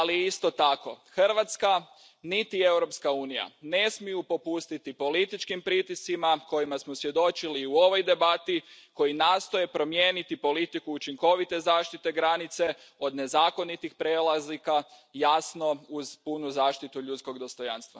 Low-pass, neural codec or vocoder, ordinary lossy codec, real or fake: none; none; none; real